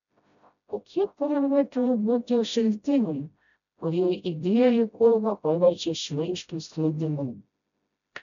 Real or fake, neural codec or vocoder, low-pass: fake; codec, 16 kHz, 0.5 kbps, FreqCodec, smaller model; 7.2 kHz